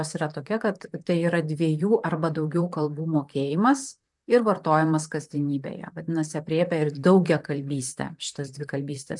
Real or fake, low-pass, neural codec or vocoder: fake; 10.8 kHz; vocoder, 44.1 kHz, 128 mel bands, Pupu-Vocoder